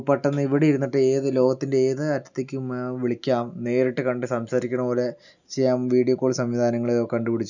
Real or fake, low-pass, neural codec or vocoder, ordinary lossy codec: real; 7.2 kHz; none; none